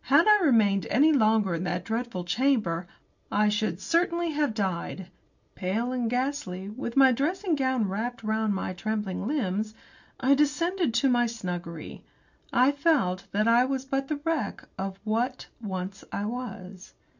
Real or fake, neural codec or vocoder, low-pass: real; none; 7.2 kHz